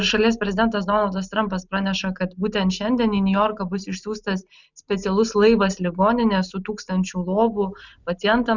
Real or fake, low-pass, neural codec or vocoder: real; 7.2 kHz; none